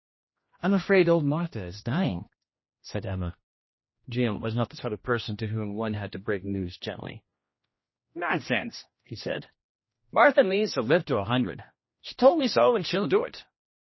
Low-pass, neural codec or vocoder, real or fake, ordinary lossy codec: 7.2 kHz; codec, 16 kHz, 1 kbps, X-Codec, HuBERT features, trained on general audio; fake; MP3, 24 kbps